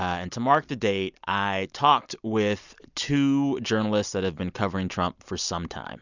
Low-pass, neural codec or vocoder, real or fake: 7.2 kHz; none; real